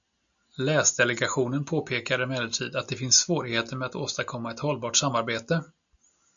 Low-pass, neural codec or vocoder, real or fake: 7.2 kHz; none; real